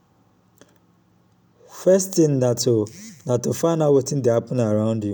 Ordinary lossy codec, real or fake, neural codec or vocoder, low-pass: none; real; none; none